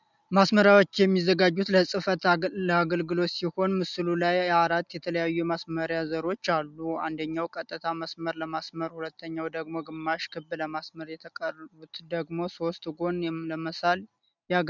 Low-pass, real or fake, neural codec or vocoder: 7.2 kHz; real; none